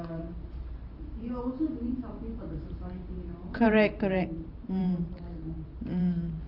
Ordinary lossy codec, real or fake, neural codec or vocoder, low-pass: Opus, 32 kbps; fake; vocoder, 44.1 kHz, 128 mel bands every 512 samples, BigVGAN v2; 5.4 kHz